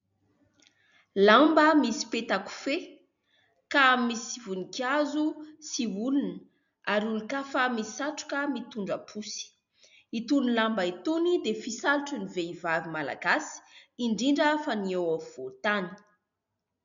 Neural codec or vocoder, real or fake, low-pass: none; real; 7.2 kHz